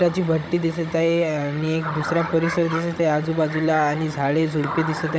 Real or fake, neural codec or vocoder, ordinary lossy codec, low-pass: fake; codec, 16 kHz, 16 kbps, FunCodec, trained on Chinese and English, 50 frames a second; none; none